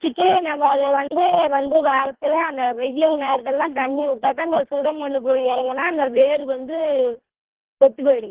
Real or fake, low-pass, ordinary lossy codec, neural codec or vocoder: fake; 3.6 kHz; Opus, 16 kbps; codec, 24 kHz, 1.5 kbps, HILCodec